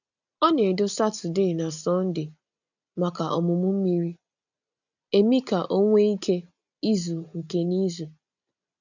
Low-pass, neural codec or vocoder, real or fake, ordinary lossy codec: 7.2 kHz; none; real; none